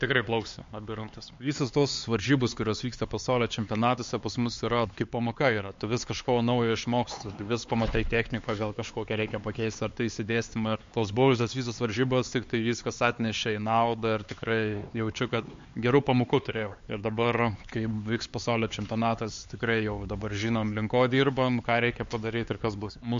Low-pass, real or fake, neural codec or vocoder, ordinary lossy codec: 7.2 kHz; fake; codec, 16 kHz, 4 kbps, X-Codec, HuBERT features, trained on LibriSpeech; MP3, 48 kbps